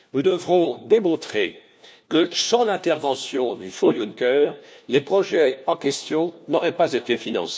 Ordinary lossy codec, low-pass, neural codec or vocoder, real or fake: none; none; codec, 16 kHz, 1 kbps, FunCodec, trained on LibriTTS, 50 frames a second; fake